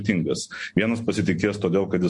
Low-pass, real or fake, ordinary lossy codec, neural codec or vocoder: 10.8 kHz; real; MP3, 48 kbps; none